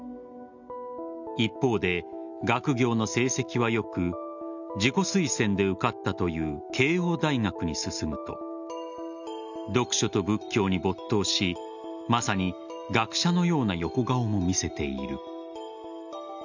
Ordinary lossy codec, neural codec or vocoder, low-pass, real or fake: none; none; 7.2 kHz; real